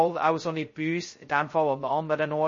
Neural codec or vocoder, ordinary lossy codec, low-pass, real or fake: codec, 16 kHz, 0.2 kbps, FocalCodec; MP3, 32 kbps; 7.2 kHz; fake